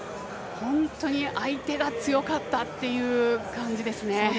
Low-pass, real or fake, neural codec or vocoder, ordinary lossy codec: none; real; none; none